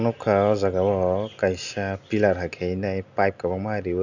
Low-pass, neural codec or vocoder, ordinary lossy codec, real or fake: 7.2 kHz; none; none; real